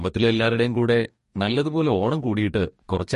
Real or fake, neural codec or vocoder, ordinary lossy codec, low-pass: fake; codec, 44.1 kHz, 2.6 kbps, DAC; MP3, 48 kbps; 14.4 kHz